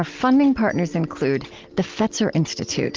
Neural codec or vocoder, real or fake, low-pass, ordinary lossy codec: none; real; 7.2 kHz; Opus, 16 kbps